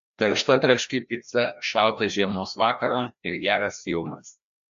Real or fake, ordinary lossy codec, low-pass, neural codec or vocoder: fake; MP3, 64 kbps; 7.2 kHz; codec, 16 kHz, 1 kbps, FreqCodec, larger model